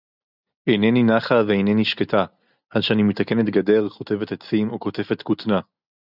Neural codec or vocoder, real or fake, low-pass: none; real; 5.4 kHz